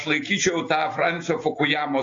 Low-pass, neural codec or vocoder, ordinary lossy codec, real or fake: 7.2 kHz; none; AAC, 32 kbps; real